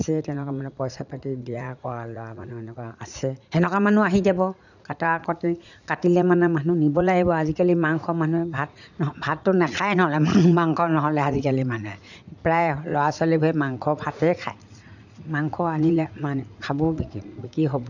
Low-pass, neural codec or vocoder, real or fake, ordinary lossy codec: 7.2 kHz; vocoder, 44.1 kHz, 80 mel bands, Vocos; fake; none